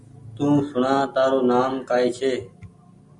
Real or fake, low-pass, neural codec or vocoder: real; 10.8 kHz; none